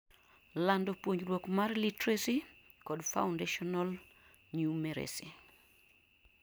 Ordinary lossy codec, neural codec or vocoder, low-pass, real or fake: none; none; none; real